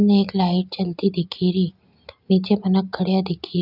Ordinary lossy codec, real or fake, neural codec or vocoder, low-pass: none; real; none; 5.4 kHz